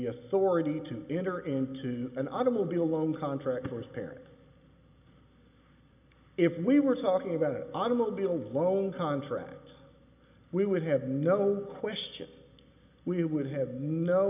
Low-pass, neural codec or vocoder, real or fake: 3.6 kHz; none; real